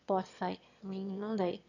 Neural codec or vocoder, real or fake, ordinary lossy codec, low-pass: autoencoder, 22.05 kHz, a latent of 192 numbers a frame, VITS, trained on one speaker; fake; none; 7.2 kHz